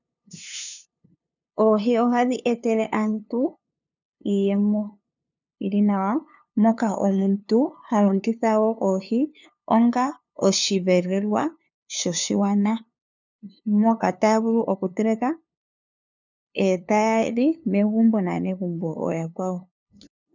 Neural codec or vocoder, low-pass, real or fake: codec, 16 kHz, 2 kbps, FunCodec, trained on LibriTTS, 25 frames a second; 7.2 kHz; fake